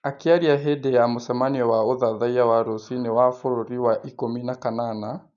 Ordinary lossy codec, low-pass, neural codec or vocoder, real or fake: none; 7.2 kHz; none; real